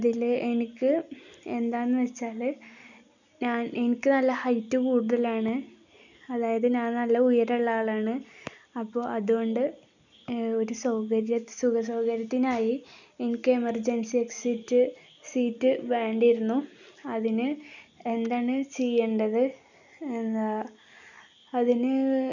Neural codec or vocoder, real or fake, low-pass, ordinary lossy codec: none; real; 7.2 kHz; none